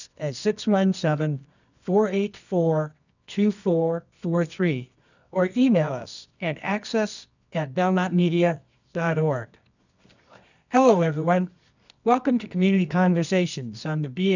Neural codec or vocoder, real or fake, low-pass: codec, 24 kHz, 0.9 kbps, WavTokenizer, medium music audio release; fake; 7.2 kHz